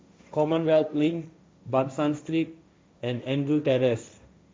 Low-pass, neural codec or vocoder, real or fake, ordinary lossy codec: none; codec, 16 kHz, 1.1 kbps, Voila-Tokenizer; fake; none